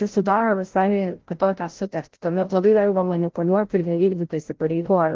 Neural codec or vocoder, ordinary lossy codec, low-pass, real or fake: codec, 16 kHz, 0.5 kbps, FreqCodec, larger model; Opus, 16 kbps; 7.2 kHz; fake